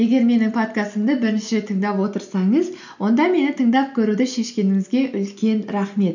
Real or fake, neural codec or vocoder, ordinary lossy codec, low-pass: real; none; none; 7.2 kHz